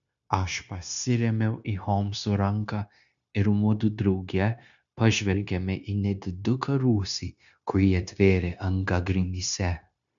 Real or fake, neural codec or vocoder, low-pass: fake; codec, 16 kHz, 0.9 kbps, LongCat-Audio-Codec; 7.2 kHz